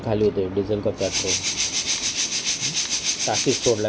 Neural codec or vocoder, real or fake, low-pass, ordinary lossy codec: none; real; none; none